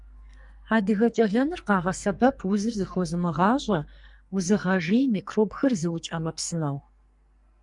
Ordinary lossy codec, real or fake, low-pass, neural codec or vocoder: Opus, 64 kbps; fake; 10.8 kHz; codec, 44.1 kHz, 2.6 kbps, SNAC